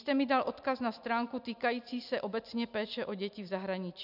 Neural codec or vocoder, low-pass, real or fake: none; 5.4 kHz; real